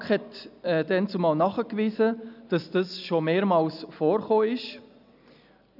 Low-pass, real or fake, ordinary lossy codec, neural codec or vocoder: 5.4 kHz; real; none; none